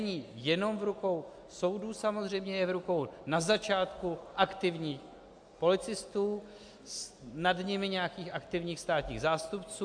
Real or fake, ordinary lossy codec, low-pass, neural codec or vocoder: real; AAC, 64 kbps; 9.9 kHz; none